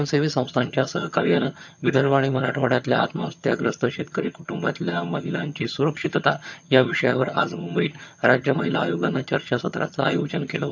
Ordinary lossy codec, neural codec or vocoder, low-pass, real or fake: none; vocoder, 22.05 kHz, 80 mel bands, HiFi-GAN; 7.2 kHz; fake